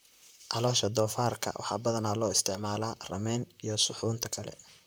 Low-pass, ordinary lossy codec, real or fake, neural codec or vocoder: none; none; fake; vocoder, 44.1 kHz, 128 mel bands, Pupu-Vocoder